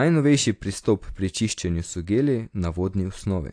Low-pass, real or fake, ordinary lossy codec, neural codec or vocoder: 9.9 kHz; real; AAC, 48 kbps; none